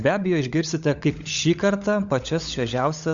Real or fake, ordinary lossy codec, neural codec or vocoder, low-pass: fake; Opus, 64 kbps; codec, 16 kHz, 16 kbps, FunCodec, trained on LibriTTS, 50 frames a second; 7.2 kHz